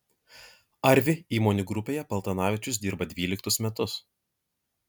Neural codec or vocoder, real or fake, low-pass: none; real; 19.8 kHz